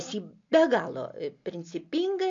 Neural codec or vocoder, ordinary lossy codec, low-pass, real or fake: none; MP3, 48 kbps; 7.2 kHz; real